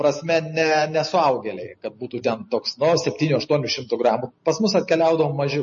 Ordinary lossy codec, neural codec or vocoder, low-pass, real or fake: MP3, 32 kbps; none; 7.2 kHz; real